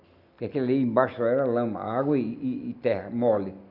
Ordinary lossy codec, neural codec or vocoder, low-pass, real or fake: none; none; 5.4 kHz; real